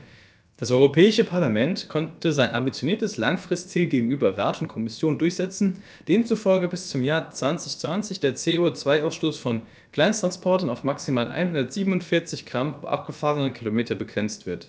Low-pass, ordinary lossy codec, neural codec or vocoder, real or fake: none; none; codec, 16 kHz, about 1 kbps, DyCAST, with the encoder's durations; fake